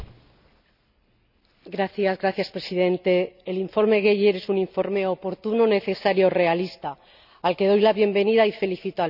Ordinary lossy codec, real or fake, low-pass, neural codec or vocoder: none; real; 5.4 kHz; none